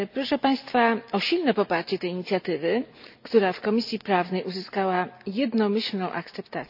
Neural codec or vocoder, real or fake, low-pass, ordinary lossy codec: none; real; 5.4 kHz; none